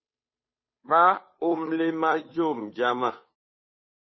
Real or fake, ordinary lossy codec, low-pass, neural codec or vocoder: fake; MP3, 24 kbps; 7.2 kHz; codec, 16 kHz, 2 kbps, FunCodec, trained on Chinese and English, 25 frames a second